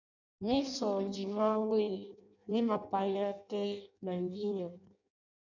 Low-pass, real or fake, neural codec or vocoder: 7.2 kHz; fake; codec, 16 kHz in and 24 kHz out, 0.6 kbps, FireRedTTS-2 codec